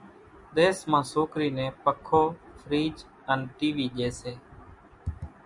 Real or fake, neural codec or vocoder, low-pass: real; none; 10.8 kHz